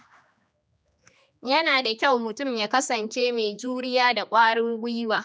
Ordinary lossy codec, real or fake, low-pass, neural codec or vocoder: none; fake; none; codec, 16 kHz, 2 kbps, X-Codec, HuBERT features, trained on general audio